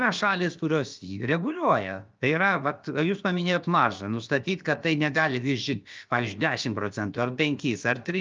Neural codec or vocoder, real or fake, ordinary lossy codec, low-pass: codec, 16 kHz, about 1 kbps, DyCAST, with the encoder's durations; fake; Opus, 24 kbps; 7.2 kHz